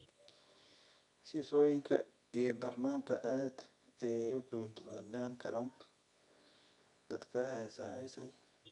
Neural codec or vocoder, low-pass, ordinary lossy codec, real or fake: codec, 24 kHz, 0.9 kbps, WavTokenizer, medium music audio release; 10.8 kHz; none; fake